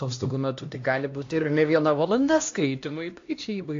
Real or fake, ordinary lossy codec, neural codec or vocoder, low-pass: fake; AAC, 48 kbps; codec, 16 kHz, 1 kbps, X-Codec, HuBERT features, trained on LibriSpeech; 7.2 kHz